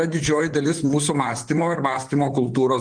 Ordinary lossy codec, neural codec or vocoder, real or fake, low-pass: Opus, 24 kbps; vocoder, 44.1 kHz, 128 mel bands, Pupu-Vocoder; fake; 9.9 kHz